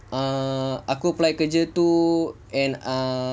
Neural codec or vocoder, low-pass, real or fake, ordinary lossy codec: none; none; real; none